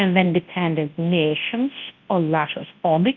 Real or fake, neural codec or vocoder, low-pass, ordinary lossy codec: fake; codec, 24 kHz, 0.9 kbps, WavTokenizer, large speech release; 7.2 kHz; Opus, 24 kbps